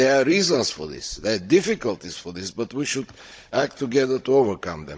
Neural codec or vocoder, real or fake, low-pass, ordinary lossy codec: codec, 16 kHz, 16 kbps, FunCodec, trained on Chinese and English, 50 frames a second; fake; none; none